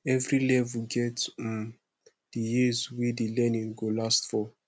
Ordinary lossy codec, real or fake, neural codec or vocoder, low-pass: none; real; none; none